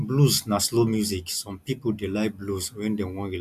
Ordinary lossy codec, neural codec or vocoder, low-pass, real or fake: none; none; 14.4 kHz; real